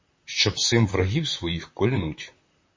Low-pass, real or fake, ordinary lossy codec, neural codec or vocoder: 7.2 kHz; fake; MP3, 32 kbps; vocoder, 44.1 kHz, 128 mel bands, Pupu-Vocoder